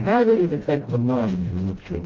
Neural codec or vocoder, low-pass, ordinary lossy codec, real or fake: codec, 16 kHz, 0.5 kbps, FreqCodec, smaller model; 7.2 kHz; Opus, 32 kbps; fake